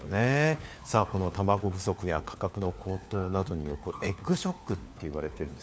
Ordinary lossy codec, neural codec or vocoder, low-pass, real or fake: none; codec, 16 kHz, 4 kbps, FunCodec, trained on LibriTTS, 50 frames a second; none; fake